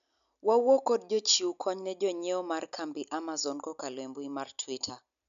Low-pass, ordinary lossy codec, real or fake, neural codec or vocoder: 7.2 kHz; MP3, 96 kbps; real; none